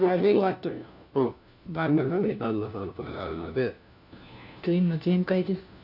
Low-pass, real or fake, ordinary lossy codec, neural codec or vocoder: 5.4 kHz; fake; none; codec, 16 kHz, 1 kbps, FunCodec, trained on LibriTTS, 50 frames a second